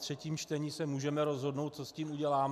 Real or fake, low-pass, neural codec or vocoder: real; 14.4 kHz; none